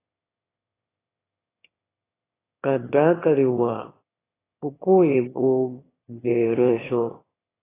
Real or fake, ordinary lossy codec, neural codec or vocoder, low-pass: fake; AAC, 16 kbps; autoencoder, 22.05 kHz, a latent of 192 numbers a frame, VITS, trained on one speaker; 3.6 kHz